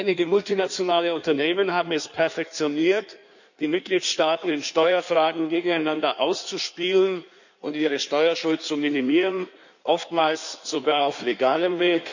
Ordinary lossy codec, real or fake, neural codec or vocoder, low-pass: none; fake; codec, 16 kHz in and 24 kHz out, 1.1 kbps, FireRedTTS-2 codec; 7.2 kHz